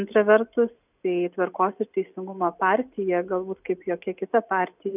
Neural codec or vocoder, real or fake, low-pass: none; real; 3.6 kHz